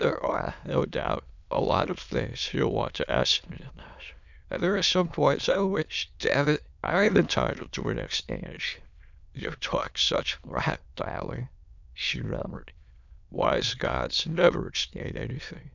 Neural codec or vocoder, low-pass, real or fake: autoencoder, 22.05 kHz, a latent of 192 numbers a frame, VITS, trained on many speakers; 7.2 kHz; fake